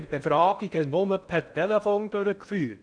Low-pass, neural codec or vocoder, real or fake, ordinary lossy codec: 9.9 kHz; codec, 16 kHz in and 24 kHz out, 0.6 kbps, FocalCodec, streaming, 4096 codes; fake; none